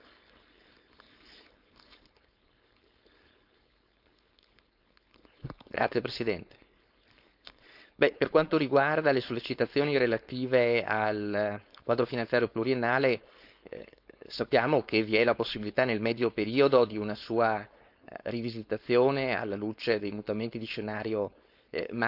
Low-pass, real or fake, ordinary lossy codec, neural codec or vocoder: 5.4 kHz; fake; none; codec, 16 kHz, 4.8 kbps, FACodec